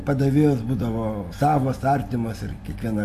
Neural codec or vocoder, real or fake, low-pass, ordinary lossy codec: none; real; 14.4 kHz; AAC, 64 kbps